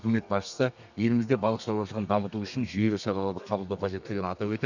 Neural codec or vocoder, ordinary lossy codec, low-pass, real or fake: codec, 32 kHz, 1.9 kbps, SNAC; none; 7.2 kHz; fake